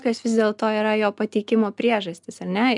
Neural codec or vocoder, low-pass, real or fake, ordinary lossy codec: none; 10.8 kHz; real; MP3, 96 kbps